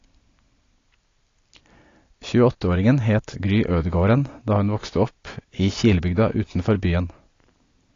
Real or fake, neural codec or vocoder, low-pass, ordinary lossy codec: real; none; 7.2 kHz; AAC, 32 kbps